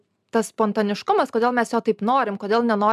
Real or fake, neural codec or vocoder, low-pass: real; none; 14.4 kHz